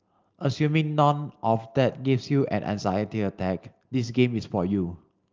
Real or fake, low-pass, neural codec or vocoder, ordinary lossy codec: real; 7.2 kHz; none; Opus, 32 kbps